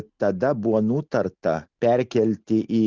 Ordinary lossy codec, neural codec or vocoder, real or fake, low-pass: Opus, 64 kbps; none; real; 7.2 kHz